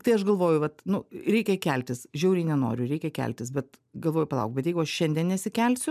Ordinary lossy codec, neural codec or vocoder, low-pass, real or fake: MP3, 96 kbps; none; 14.4 kHz; real